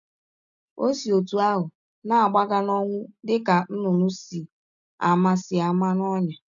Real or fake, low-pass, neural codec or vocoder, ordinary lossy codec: real; 7.2 kHz; none; none